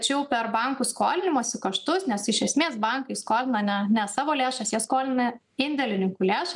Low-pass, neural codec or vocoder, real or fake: 10.8 kHz; none; real